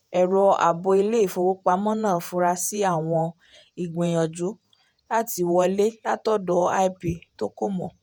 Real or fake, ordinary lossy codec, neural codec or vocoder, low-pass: fake; none; vocoder, 48 kHz, 128 mel bands, Vocos; none